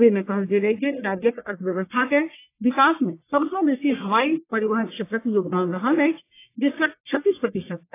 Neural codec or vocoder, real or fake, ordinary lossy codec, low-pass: codec, 44.1 kHz, 1.7 kbps, Pupu-Codec; fake; AAC, 24 kbps; 3.6 kHz